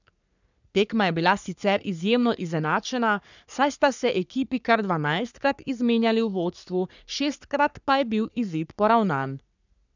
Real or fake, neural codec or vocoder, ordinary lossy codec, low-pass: fake; codec, 44.1 kHz, 3.4 kbps, Pupu-Codec; none; 7.2 kHz